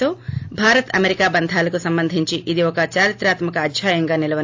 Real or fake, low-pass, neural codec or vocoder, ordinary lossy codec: real; 7.2 kHz; none; AAC, 48 kbps